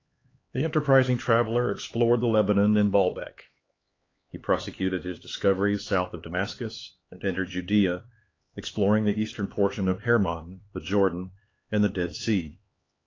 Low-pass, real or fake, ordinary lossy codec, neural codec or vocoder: 7.2 kHz; fake; AAC, 32 kbps; codec, 16 kHz, 4 kbps, X-Codec, HuBERT features, trained on LibriSpeech